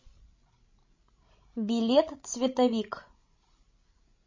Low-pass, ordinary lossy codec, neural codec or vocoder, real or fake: 7.2 kHz; MP3, 32 kbps; codec, 16 kHz, 16 kbps, FreqCodec, larger model; fake